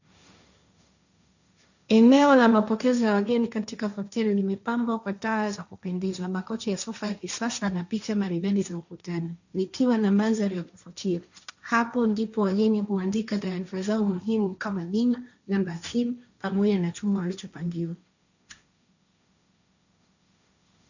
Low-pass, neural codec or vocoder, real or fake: 7.2 kHz; codec, 16 kHz, 1.1 kbps, Voila-Tokenizer; fake